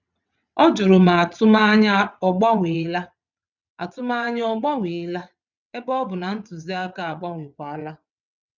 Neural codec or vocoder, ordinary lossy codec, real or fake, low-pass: vocoder, 22.05 kHz, 80 mel bands, WaveNeXt; none; fake; 7.2 kHz